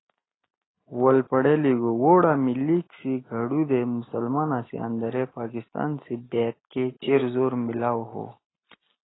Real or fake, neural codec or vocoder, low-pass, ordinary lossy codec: real; none; 7.2 kHz; AAC, 16 kbps